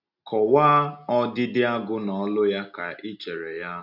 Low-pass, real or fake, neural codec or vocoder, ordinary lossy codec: 5.4 kHz; real; none; none